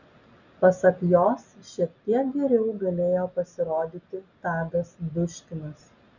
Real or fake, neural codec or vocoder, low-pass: real; none; 7.2 kHz